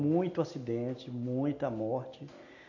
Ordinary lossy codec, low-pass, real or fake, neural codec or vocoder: AAC, 48 kbps; 7.2 kHz; real; none